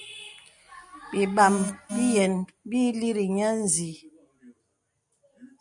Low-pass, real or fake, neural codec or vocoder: 10.8 kHz; real; none